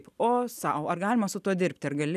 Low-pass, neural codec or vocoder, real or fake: 14.4 kHz; none; real